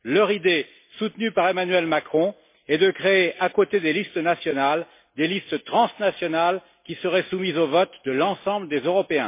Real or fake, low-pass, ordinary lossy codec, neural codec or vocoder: real; 3.6 kHz; MP3, 24 kbps; none